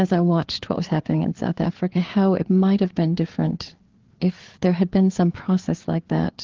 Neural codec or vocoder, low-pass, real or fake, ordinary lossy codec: codec, 16 kHz, 4 kbps, FreqCodec, larger model; 7.2 kHz; fake; Opus, 16 kbps